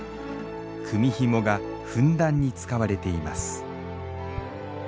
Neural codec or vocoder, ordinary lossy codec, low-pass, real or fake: none; none; none; real